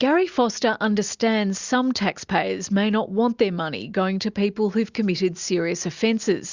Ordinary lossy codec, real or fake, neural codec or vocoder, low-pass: Opus, 64 kbps; real; none; 7.2 kHz